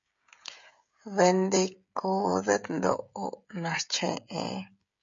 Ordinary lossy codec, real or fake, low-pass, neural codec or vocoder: MP3, 48 kbps; fake; 7.2 kHz; codec, 16 kHz, 16 kbps, FreqCodec, smaller model